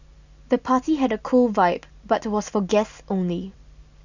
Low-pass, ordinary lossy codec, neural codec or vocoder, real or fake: 7.2 kHz; none; none; real